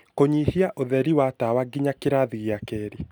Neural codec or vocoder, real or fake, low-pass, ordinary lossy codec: none; real; none; none